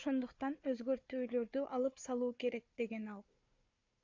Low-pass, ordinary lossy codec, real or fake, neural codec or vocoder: 7.2 kHz; AAC, 48 kbps; fake; codec, 16 kHz, 4 kbps, FunCodec, trained on Chinese and English, 50 frames a second